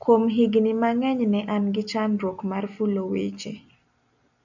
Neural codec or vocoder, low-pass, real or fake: none; 7.2 kHz; real